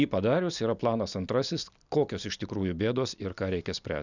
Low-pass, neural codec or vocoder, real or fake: 7.2 kHz; none; real